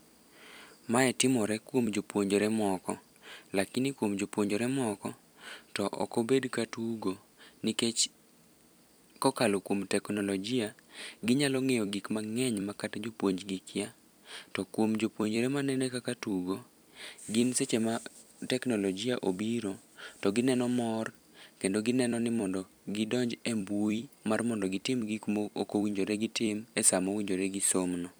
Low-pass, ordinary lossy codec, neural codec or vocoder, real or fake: none; none; vocoder, 44.1 kHz, 128 mel bands every 256 samples, BigVGAN v2; fake